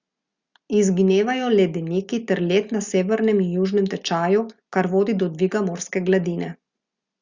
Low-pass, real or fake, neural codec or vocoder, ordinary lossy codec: 7.2 kHz; real; none; Opus, 64 kbps